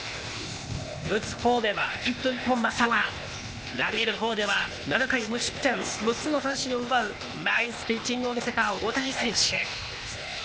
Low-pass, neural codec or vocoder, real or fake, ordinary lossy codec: none; codec, 16 kHz, 0.8 kbps, ZipCodec; fake; none